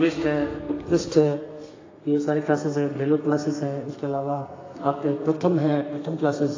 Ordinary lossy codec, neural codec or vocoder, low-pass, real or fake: AAC, 32 kbps; codec, 32 kHz, 1.9 kbps, SNAC; 7.2 kHz; fake